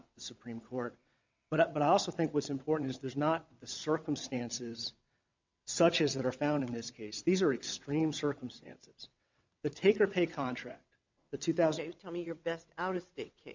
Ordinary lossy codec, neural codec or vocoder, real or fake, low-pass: MP3, 64 kbps; vocoder, 22.05 kHz, 80 mel bands, WaveNeXt; fake; 7.2 kHz